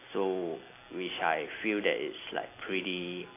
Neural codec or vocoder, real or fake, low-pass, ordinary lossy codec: none; real; 3.6 kHz; AAC, 24 kbps